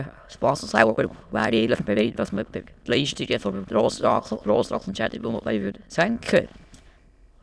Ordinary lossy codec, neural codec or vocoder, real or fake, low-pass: none; autoencoder, 22.05 kHz, a latent of 192 numbers a frame, VITS, trained on many speakers; fake; none